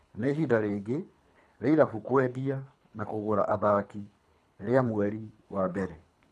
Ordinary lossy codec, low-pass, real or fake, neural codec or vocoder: none; none; fake; codec, 24 kHz, 3 kbps, HILCodec